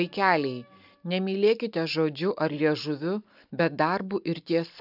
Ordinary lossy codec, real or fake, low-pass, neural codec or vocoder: AAC, 48 kbps; real; 5.4 kHz; none